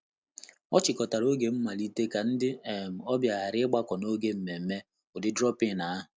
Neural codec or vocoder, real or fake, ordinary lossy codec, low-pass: none; real; none; none